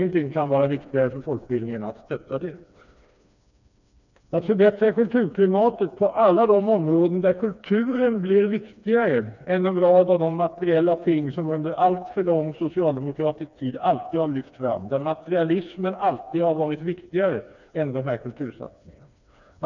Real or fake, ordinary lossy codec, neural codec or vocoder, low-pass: fake; none; codec, 16 kHz, 2 kbps, FreqCodec, smaller model; 7.2 kHz